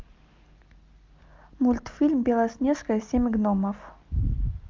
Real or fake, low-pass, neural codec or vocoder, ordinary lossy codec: real; 7.2 kHz; none; Opus, 32 kbps